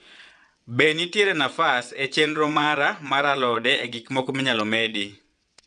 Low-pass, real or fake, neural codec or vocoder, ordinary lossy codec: 9.9 kHz; fake; vocoder, 22.05 kHz, 80 mel bands, WaveNeXt; none